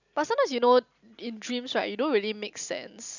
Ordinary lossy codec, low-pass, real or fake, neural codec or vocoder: none; 7.2 kHz; real; none